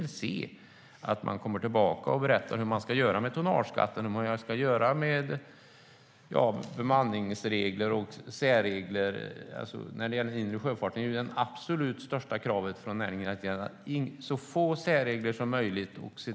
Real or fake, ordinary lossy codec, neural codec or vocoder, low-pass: real; none; none; none